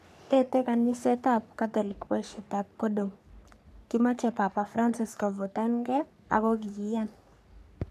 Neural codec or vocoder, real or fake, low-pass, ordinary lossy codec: codec, 44.1 kHz, 3.4 kbps, Pupu-Codec; fake; 14.4 kHz; none